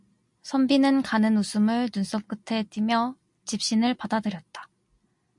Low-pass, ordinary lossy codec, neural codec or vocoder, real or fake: 10.8 kHz; MP3, 64 kbps; none; real